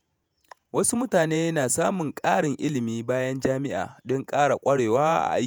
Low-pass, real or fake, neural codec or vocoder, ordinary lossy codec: none; fake; vocoder, 48 kHz, 128 mel bands, Vocos; none